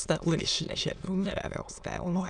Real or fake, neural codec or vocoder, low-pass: fake; autoencoder, 22.05 kHz, a latent of 192 numbers a frame, VITS, trained on many speakers; 9.9 kHz